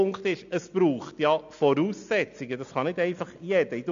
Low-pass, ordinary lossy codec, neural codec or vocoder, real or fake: 7.2 kHz; MP3, 64 kbps; none; real